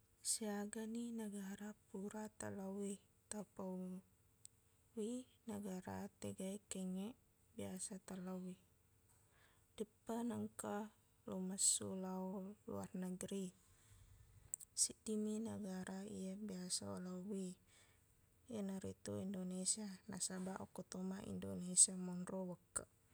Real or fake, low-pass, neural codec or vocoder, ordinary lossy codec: real; none; none; none